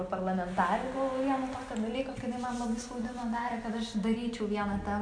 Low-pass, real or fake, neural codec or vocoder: 9.9 kHz; real; none